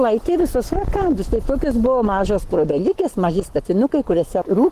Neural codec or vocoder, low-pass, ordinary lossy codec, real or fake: codec, 44.1 kHz, 7.8 kbps, Pupu-Codec; 14.4 kHz; Opus, 16 kbps; fake